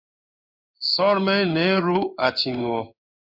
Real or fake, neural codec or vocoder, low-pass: fake; codec, 16 kHz in and 24 kHz out, 1 kbps, XY-Tokenizer; 5.4 kHz